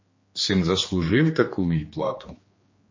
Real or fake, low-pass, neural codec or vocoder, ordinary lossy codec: fake; 7.2 kHz; codec, 16 kHz, 2 kbps, X-Codec, HuBERT features, trained on general audio; MP3, 32 kbps